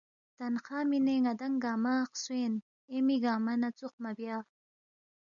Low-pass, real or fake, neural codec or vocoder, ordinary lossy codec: 7.2 kHz; real; none; MP3, 96 kbps